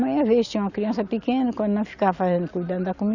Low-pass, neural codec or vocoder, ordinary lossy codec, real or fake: 7.2 kHz; none; none; real